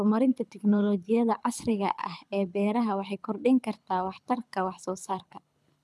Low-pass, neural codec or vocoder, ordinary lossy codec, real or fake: none; codec, 24 kHz, 6 kbps, HILCodec; none; fake